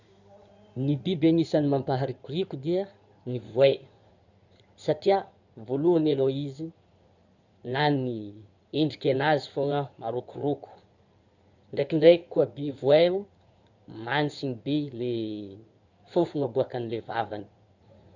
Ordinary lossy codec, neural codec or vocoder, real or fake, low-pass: none; codec, 16 kHz in and 24 kHz out, 2.2 kbps, FireRedTTS-2 codec; fake; 7.2 kHz